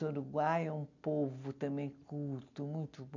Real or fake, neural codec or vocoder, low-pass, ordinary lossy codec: real; none; 7.2 kHz; none